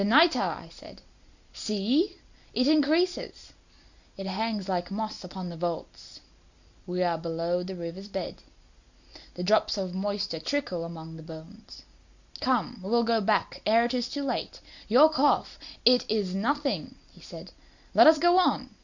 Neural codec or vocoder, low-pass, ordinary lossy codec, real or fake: none; 7.2 kHz; Opus, 64 kbps; real